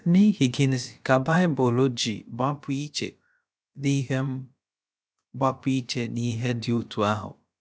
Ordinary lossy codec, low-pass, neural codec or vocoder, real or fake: none; none; codec, 16 kHz, about 1 kbps, DyCAST, with the encoder's durations; fake